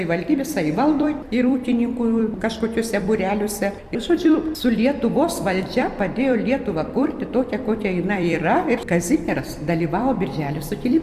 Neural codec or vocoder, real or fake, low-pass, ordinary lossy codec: vocoder, 44.1 kHz, 128 mel bands every 256 samples, BigVGAN v2; fake; 14.4 kHz; Opus, 64 kbps